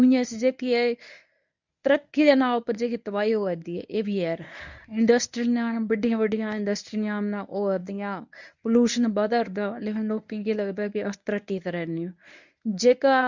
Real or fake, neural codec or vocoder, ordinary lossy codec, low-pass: fake; codec, 24 kHz, 0.9 kbps, WavTokenizer, medium speech release version 1; none; 7.2 kHz